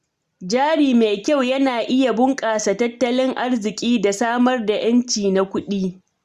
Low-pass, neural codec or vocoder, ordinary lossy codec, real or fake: 14.4 kHz; none; Opus, 64 kbps; real